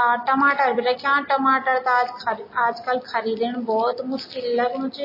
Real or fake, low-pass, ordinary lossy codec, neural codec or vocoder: real; 5.4 kHz; MP3, 24 kbps; none